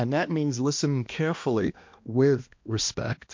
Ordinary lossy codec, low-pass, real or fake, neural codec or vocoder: MP3, 48 kbps; 7.2 kHz; fake; codec, 16 kHz, 1 kbps, X-Codec, HuBERT features, trained on balanced general audio